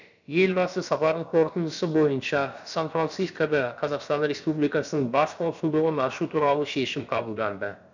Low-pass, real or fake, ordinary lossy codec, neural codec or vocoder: 7.2 kHz; fake; none; codec, 16 kHz, about 1 kbps, DyCAST, with the encoder's durations